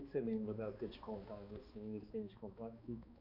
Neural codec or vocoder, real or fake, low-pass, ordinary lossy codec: codec, 16 kHz, 2 kbps, X-Codec, HuBERT features, trained on balanced general audio; fake; 5.4 kHz; AAC, 48 kbps